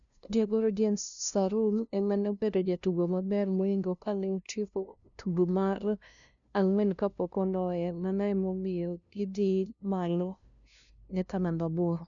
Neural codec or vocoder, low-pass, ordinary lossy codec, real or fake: codec, 16 kHz, 0.5 kbps, FunCodec, trained on LibriTTS, 25 frames a second; 7.2 kHz; none; fake